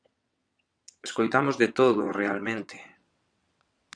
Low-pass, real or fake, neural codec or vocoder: 9.9 kHz; fake; vocoder, 22.05 kHz, 80 mel bands, WaveNeXt